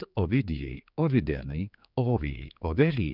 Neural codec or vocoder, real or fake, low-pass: codec, 16 kHz, 4 kbps, X-Codec, HuBERT features, trained on general audio; fake; 5.4 kHz